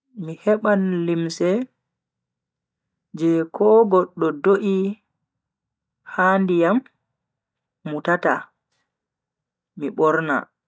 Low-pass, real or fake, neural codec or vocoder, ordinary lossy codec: none; real; none; none